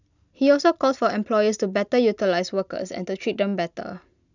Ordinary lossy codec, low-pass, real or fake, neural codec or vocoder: none; 7.2 kHz; real; none